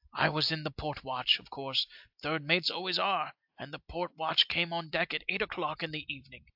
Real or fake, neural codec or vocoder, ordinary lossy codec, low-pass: real; none; AAC, 48 kbps; 5.4 kHz